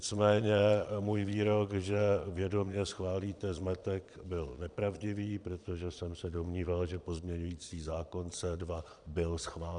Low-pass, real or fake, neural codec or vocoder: 9.9 kHz; fake; vocoder, 22.05 kHz, 80 mel bands, Vocos